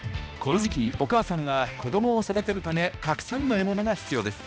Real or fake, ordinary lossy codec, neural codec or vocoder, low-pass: fake; none; codec, 16 kHz, 1 kbps, X-Codec, HuBERT features, trained on balanced general audio; none